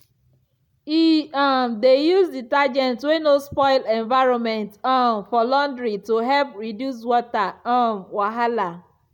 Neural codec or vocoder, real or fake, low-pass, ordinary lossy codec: none; real; 19.8 kHz; none